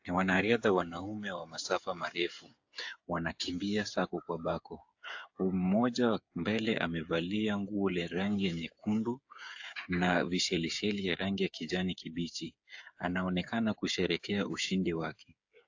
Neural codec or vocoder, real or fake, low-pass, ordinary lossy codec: codec, 16 kHz, 8 kbps, FreqCodec, smaller model; fake; 7.2 kHz; AAC, 48 kbps